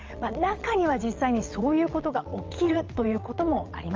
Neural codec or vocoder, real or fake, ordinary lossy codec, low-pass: vocoder, 22.05 kHz, 80 mel bands, WaveNeXt; fake; Opus, 32 kbps; 7.2 kHz